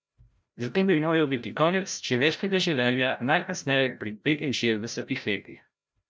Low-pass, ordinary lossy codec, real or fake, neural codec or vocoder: none; none; fake; codec, 16 kHz, 0.5 kbps, FreqCodec, larger model